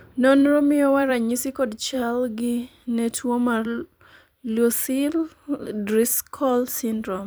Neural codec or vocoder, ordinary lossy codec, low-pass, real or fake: vocoder, 44.1 kHz, 128 mel bands every 256 samples, BigVGAN v2; none; none; fake